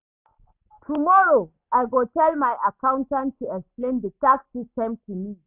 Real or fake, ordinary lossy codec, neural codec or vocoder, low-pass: real; none; none; 3.6 kHz